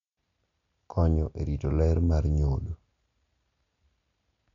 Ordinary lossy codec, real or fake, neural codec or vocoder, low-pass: none; real; none; 7.2 kHz